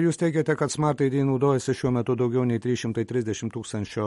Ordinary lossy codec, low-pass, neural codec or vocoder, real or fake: MP3, 48 kbps; 19.8 kHz; none; real